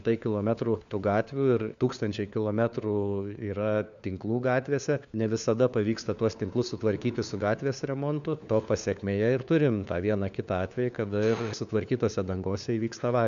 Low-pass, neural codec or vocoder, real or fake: 7.2 kHz; codec, 16 kHz, 4 kbps, FunCodec, trained on LibriTTS, 50 frames a second; fake